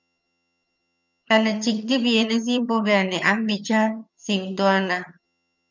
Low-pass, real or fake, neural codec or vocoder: 7.2 kHz; fake; vocoder, 22.05 kHz, 80 mel bands, HiFi-GAN